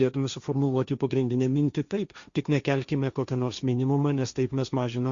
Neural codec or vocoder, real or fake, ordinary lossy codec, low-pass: codec, 16 kHz, 1.1 kbps, Voila-Tokenizer; fake; Opus, 64 kbps; 7.2 kHz